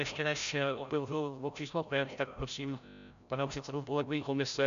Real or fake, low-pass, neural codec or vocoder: fake; 7.2 kHz; codec, 16 kHz, 0.5 kbps, FreqCodec, larger model